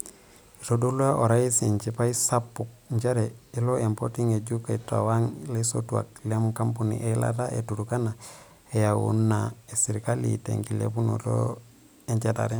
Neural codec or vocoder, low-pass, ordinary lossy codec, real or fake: none; none; none; real